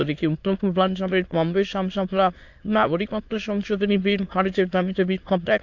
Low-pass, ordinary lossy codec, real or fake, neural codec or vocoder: 7.2 kHz; AAC, 48 kbps; fake; autoencoder, 22.05 kHz, a latent of 192 numbers a frame, VITS, trained on many speakers